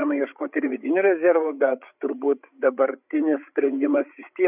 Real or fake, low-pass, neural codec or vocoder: fake; 3.6 kHz; codec, 16 kHz, 8 kbps, FreqCodec, larger model